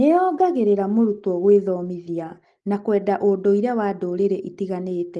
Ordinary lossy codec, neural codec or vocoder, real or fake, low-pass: Opus, 24 kbps; none; real; 10.8 kHz